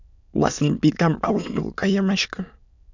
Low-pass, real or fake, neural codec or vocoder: 7.2 kHz; fake; autoencoder, 22.05 kHz, a latent of 192 numbers a frame, VITS, trained on many speakers